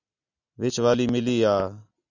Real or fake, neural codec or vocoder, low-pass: real; none; 7.2 kHz